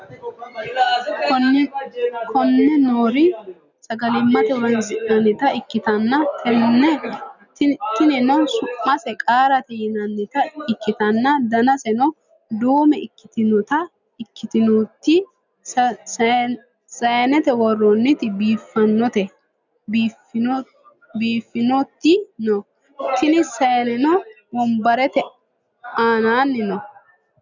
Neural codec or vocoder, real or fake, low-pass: none; real; 7.2 kHz